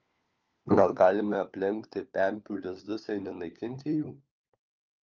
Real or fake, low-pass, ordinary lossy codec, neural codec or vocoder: fake; 7.2 kHz; Opus, 24 kbps; codec, 16 kHz, 4 kbps, FunCodec, trained on LibriTTS, 50 frames a second